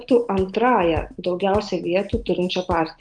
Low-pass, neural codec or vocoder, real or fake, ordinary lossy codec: 9.9 kHz; none; real; Opus, 32 kbps